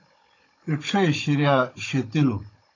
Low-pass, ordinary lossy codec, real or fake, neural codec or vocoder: 7.2 kHz; AAC, 32 kbps; fake; codec, 16 kHz, 16 kbps, FunCodec, trained on Chinese and English, 50 frames a second